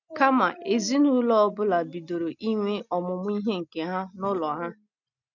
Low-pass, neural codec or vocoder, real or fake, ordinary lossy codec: 7.2 kHz; none; real; none